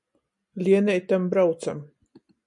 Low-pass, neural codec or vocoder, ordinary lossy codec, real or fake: 10.8 kHz; none; MP3, 96 kbps; real